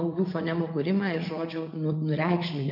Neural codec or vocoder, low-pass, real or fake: vocoder, 44.1 kHz, 80 mel bands, Vocos; 5.4 kHz; fake